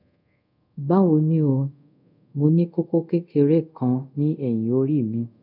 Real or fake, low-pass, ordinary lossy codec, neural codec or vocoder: fake; 5.4 kHz; none; codec, 24 kHz, 0.5 kbps, DualCodec